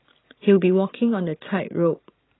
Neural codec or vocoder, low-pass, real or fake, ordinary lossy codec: none; 7.2 kHz; real; AAC, 16 kbps